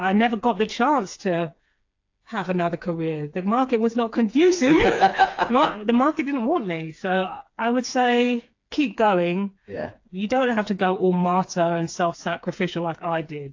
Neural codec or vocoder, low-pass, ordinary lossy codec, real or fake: codec, 16 kHz, 4 kbps, FreqCodec, smaller model; 7.2 kHz; AAC, 48 kbps; fake